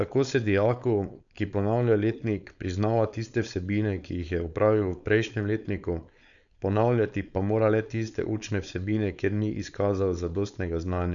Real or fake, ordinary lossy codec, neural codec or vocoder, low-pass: fake; none; codec, 16 kHz, 4.8 kbps, FACodec; 7.2 kHz